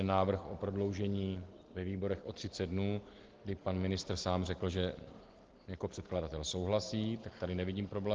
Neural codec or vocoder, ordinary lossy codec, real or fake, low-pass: none; Opus, 16 kbps; real; 7.2 kHz